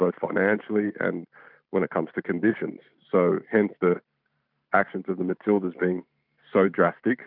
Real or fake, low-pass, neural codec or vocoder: fake; 5.4 kHz; vocoder, 44.1 kHz, 128 mel bands every 512 samples, BigVGAN v2